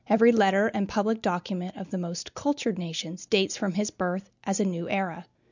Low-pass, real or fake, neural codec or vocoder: 7.2 kHz; real; none